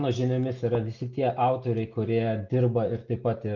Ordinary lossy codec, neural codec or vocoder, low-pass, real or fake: Opus, 24 kbps; none; 7.2 kHz; real